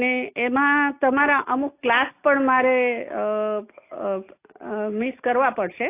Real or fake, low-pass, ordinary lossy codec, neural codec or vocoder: real; 3.6 kHz; AAC, 24 kbps; none